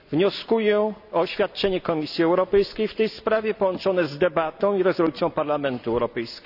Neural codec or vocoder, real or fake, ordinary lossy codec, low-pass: none; real; none; 5.4 kHz